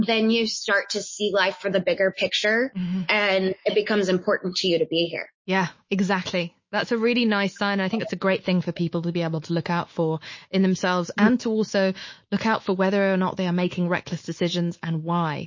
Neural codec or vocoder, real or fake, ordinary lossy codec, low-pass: autoencoder, 48 kHz, 128 numbers a frame, DAC-VAE, trained on Japanese speech; fake; MP3, 32 kbps; 7.2 kHz